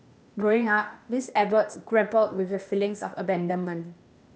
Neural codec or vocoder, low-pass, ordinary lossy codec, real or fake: codec, 16 kHz, 0.8 kbps, ZipCodec; none; none; fake